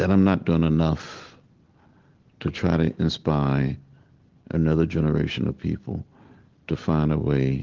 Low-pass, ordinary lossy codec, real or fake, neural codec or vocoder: 7.2 kHz; Opus, 16 kbps; real; none